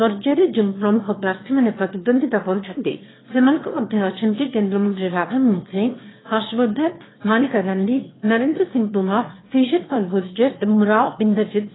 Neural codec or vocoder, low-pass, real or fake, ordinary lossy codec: autoencoder, 22.05 kHz, a latent of 192 numbers a frame, VITS, trained on one speaker; 7.2 kHz; fake; AAC, 16 kbps